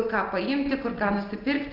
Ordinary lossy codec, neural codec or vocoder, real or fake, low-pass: Opus, 24 kbps; none; real; 5.4 kHz